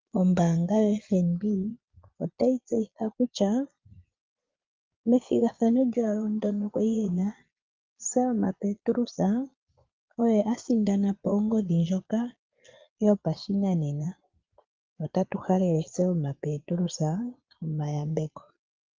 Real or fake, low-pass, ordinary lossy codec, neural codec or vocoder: fake; 7.2 kHz; Opus, 32 kbps; vocoder, 22.05 kHz, 80 mel bands, Vocos